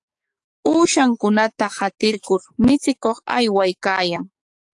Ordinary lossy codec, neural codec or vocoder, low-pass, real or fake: AAC, 64 kbps; codec, 44.1 kHz, 7.8 kbps, DAC; 10.8 kHz; fake